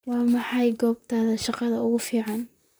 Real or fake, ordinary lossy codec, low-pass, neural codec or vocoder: fake; none; none; vocoder, 44.1 kHz, 128 mel bands, Pupu-Vocoder